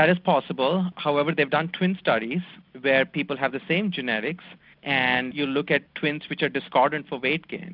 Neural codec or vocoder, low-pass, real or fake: none; 5.4 kHz; real